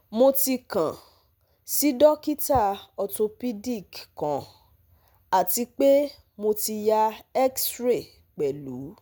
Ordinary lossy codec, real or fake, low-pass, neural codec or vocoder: none; real; none; none